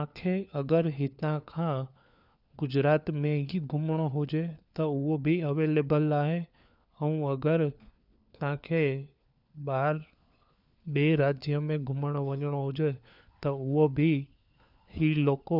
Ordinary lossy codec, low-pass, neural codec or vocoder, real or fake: none; 5.4 kHz; codec, 16 kHz, 4 kbps, FunCodec, trained on LibriTTS, 50 frames a second; fake